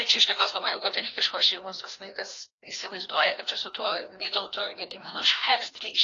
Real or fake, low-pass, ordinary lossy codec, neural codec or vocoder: fake; 7.2 kHz; AAC, 32 kbps; codec, 16 kHz, 1 kbps, FreqCodec, larger model